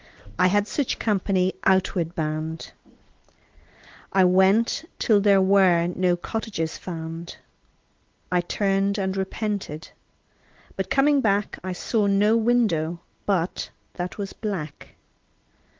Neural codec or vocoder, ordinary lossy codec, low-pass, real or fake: none; Opus, 16 kbps; 7.2 kHz; real